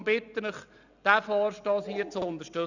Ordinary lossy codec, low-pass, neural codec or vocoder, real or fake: none; 7.2 kHz; none; real